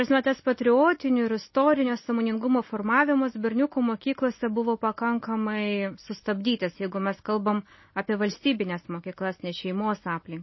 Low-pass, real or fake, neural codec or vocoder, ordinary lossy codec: 7.2 kHz; real; none; MP3, 24 kbps